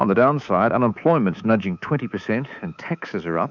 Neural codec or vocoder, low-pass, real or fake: codec, 24 kHz, 3.1 kbps, DualCodec; 7.2 kHz; fake